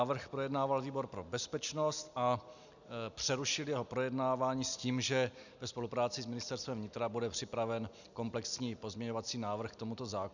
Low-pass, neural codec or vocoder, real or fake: 7.2 kHz; none; real